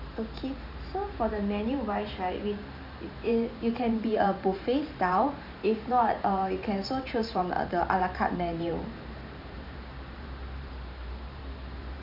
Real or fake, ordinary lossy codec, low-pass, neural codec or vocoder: fake; none; 5.4 kHz; vocoder, 44.1 kHz, 128 mel bands every 512 samples, BigVGAN v2